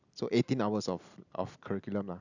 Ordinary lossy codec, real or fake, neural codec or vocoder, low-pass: none; real; none; 7.2 kHz